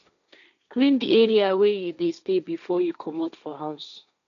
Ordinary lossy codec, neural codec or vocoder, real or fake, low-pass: none; codec, 16 kHz, 1.1 kbps, Voila-Tokenizer; fake; 7.2 kHz